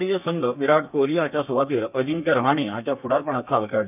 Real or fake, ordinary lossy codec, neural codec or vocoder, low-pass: fake; none; codec, 32 kHz, 1.9 kbps, SNAC; 3.6 kHz